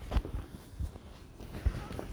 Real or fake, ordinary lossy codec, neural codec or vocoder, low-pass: real; none; none; none